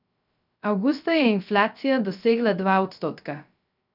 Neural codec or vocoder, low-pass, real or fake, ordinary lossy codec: codec, 16 kHz, 0.3 kbps, FocalCodec; 5.4 kHz; fake; none